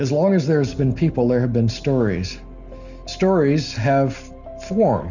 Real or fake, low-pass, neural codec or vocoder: real; 7.2 kHz; none